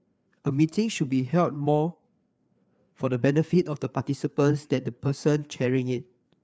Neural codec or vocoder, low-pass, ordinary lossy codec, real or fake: codec, 16 kHz, 4 kbps, FreqCodec, larger model; none; none; fake